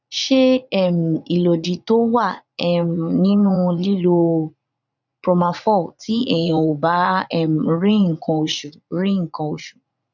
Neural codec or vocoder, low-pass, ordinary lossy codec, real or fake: vocoder, 44.1 kHz, 80 mel bands, Vocos; 7.2 kHz; none; fake